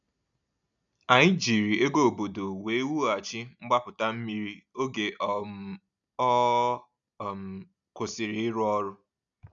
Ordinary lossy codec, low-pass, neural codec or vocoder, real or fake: none; 7.2 kHz; none; real